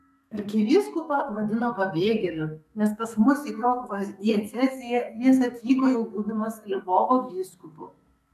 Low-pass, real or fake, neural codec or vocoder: 14.4 kHz; fake; codec, 32 kHz, 1.9 kbps, SNAC